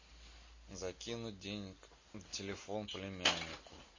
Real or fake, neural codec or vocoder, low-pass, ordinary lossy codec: real; none; 7.2 kHz; MP3, 32 kbps